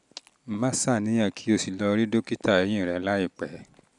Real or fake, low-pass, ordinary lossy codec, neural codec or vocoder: real; 10.8 kHz; none; none